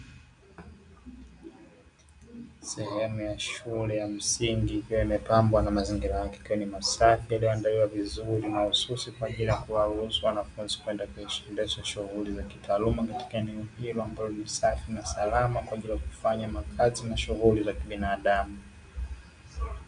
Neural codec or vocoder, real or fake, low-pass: none; real; 9.9 kHz